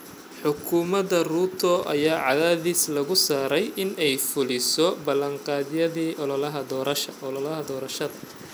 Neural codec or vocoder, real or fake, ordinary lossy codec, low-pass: none; real; none; none